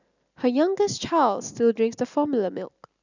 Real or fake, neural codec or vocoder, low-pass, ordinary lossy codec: real; none; 7.2 kHz; none